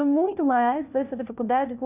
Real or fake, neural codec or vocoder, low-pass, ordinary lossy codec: fake; codec, 16 kHz, 1 kbps, FunCodec, trained on LibriTTS, 50 frames a second; 3.6 kHz; none